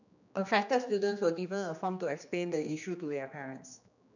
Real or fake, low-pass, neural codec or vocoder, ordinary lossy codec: fake; 7.2 kHz; codec, 16 kHz, 2 kbps, X-Codec, HuBERT features, trained on general audio; none